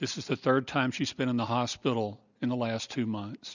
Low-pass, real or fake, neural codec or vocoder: 7.2 kHz; real; none